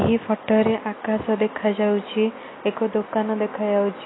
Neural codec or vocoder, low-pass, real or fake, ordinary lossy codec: none; 7.2 kHz; real; AAC, 16 kbps